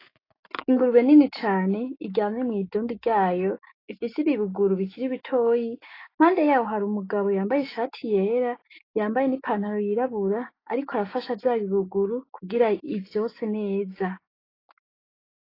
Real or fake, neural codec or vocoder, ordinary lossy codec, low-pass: fake; autoencoder, 48 kHz, 128 numbers a frame, DAC-VAE, trained on Japanese speech; AAC, 24 kbps; 5.4 kHz